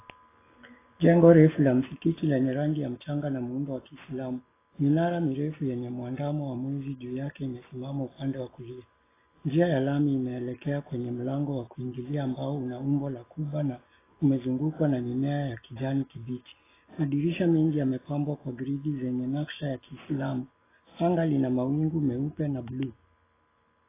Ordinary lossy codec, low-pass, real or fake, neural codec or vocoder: AAC, 16 kbps; 3.6 kHz; real; none